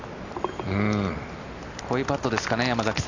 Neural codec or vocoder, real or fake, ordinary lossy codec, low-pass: none; real; none; 7.2 kHz